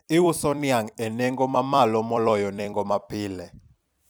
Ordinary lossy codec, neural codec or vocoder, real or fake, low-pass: none; vocoder, 44.1 kHz, 128 mel bands every 256 samples, BigVGAN v2; fake; none